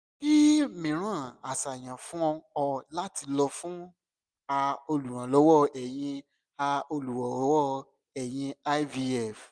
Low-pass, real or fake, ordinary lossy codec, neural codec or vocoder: none; real; none; none